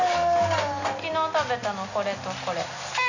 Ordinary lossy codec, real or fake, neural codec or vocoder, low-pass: none; real; none; 7.2 kHz